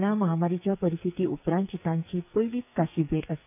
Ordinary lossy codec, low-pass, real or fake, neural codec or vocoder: none; 3.6 kHz; fake; codec, 44.1 kHz, 2.6 kbps, SNAC